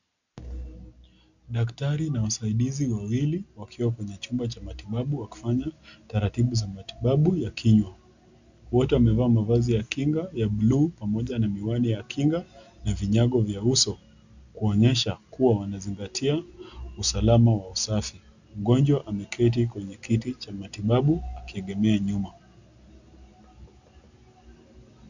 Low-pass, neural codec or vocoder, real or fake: 7.2 kHz; none; real